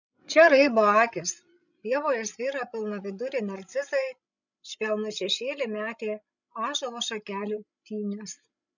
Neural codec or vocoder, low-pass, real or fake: codec, 16 kHz, 16 kbps, FreqCodec, larger model; 7.2 kHz; fake